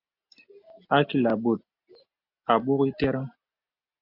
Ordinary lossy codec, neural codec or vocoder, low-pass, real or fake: MP3, 48 kbps; none; 5.4 kHz; real